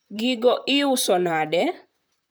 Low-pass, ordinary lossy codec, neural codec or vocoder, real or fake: none; none; vocoder, 44.1 kHz, 128 mel bands, Pupu-Vocoder; fake